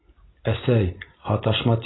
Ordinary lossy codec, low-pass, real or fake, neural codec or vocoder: AAC, 16 kbps; 7.2 kHz; real; none